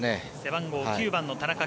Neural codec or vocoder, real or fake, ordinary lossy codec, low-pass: none; real; none; none